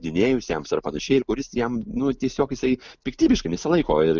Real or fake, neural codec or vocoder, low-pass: real; none; 7.2 kHz